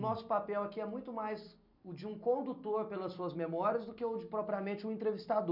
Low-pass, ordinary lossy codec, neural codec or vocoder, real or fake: 5.4 kHz; none; none; real